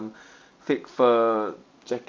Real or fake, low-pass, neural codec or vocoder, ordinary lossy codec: real; none; none; none